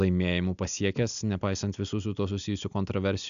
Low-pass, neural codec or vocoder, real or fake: 7.2 kHz; none; real